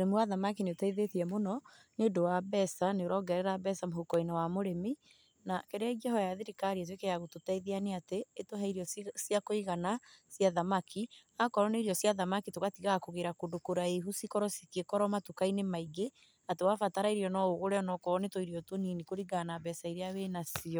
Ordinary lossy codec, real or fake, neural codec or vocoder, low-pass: none; real; none; none